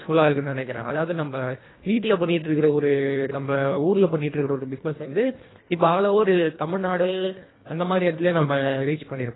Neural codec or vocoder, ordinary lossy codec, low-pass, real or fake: codec, 24 kHz, 1.5 kbps, HILCodec; AAC, 16 kbps; 7.2 kHz; fake